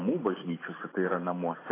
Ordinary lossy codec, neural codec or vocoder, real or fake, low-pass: AAC, 16 kbps; none; real; 3.6 kHz